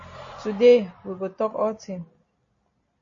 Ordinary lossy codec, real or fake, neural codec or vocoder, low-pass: MP3, 32 kbps; real; none; 7.2 kHz